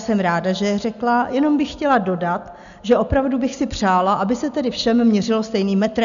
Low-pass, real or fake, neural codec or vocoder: 7.2 kHz; real; none